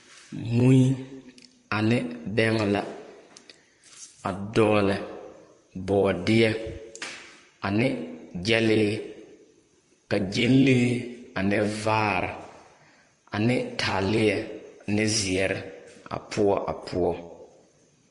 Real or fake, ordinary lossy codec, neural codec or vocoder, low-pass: fake; MP3, 48 kbps; vocoder, 44.1 kHz, 128 mel bands, Pupu-Vocoder; 14.4 kHz